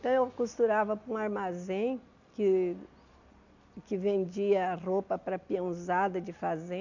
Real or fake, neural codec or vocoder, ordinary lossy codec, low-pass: real; none; none; 7.2 kHz